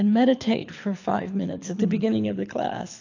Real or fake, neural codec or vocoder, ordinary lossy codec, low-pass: fake; codec, 24 kHz, 6 kbps, HILCodec; AAC, 48 kbps; 7.2 kHz